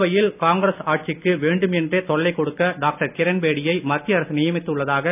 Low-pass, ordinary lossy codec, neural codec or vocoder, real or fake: 3.6 kHz; none; none; real